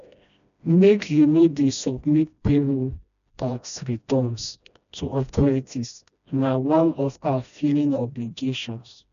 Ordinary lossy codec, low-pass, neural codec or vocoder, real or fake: none; 7.2 kHz; codec, 16 kHz, 1 kbps, FreqCodec, smaller model; fake